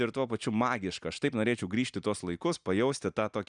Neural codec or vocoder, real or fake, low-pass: none; real; 9.9 kHz